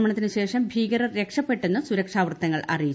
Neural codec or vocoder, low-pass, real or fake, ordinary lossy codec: none; none; real; none